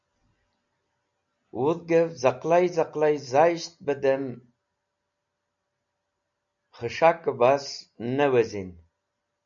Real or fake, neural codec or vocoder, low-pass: real; none; 7.2 kHz